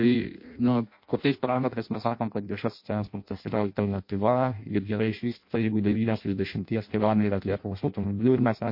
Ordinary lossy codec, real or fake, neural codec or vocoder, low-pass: MP3, 32 kbps; fake; codec, 16 kHz in and 24 kHz out, 0.6 kbps, FireRedTTS-2 codec; 5.4 kHz